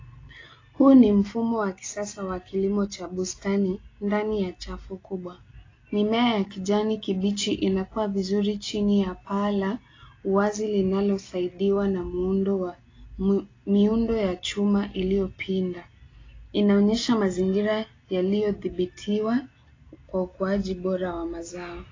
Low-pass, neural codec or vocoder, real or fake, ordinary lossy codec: 7.2 kHz; none; real; AAC, 32 kbps